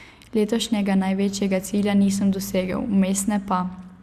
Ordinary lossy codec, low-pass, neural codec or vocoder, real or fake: Opus, 64 kbps; 14.4 kHz; none; real